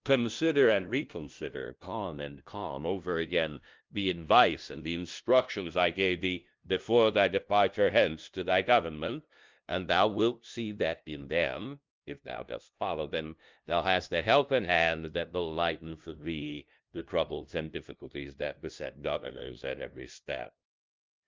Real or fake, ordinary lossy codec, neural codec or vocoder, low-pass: fake; Opus, 24 kbps; codec, 16 kHz, 0.5 kbps, FunCodec, trained on LibriTTS, 25 frames a second; 7.2 kHz